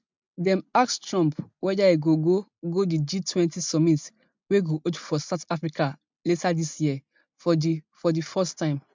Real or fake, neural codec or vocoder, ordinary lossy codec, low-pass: real; none; MP3, 64 kbps; 7.2 kHz